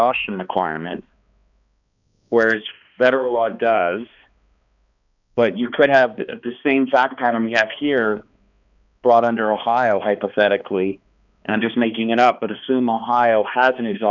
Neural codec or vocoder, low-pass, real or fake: codec, 16 kHz, 2 kbps, X-Codec, HuBERT features, trained on balanced general audio; 7.2 kHz; fake